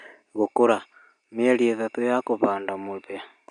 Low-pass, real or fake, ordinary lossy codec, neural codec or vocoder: 9.9 kHz; real; none; none